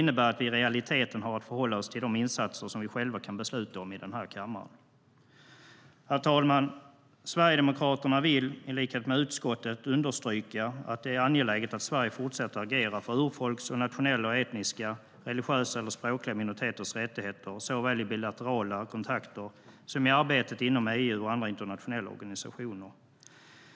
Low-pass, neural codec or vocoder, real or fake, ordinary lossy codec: none; none; real; none